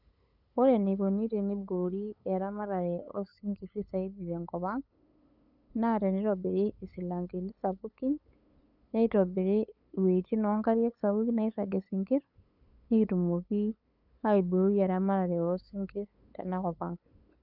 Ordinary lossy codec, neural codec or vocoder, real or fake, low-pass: none; codec, 16 kHz, 8 kbps, FunCodec, trained on LibriTTS, 25 frames a second; fake; 5.4 kHz